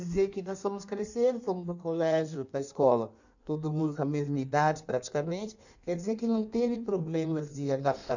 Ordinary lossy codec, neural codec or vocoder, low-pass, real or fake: none; codec, 16 kHz in and 24 kHz out, 1.1 kbps, FireRedTTS-2 codec; 7.2 kHz; fake